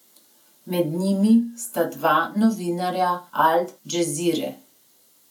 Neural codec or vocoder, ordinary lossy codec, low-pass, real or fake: none; none; 19.8 kHz; real